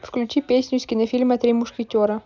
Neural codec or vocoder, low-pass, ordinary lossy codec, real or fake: none; 7.2 kHz; none; real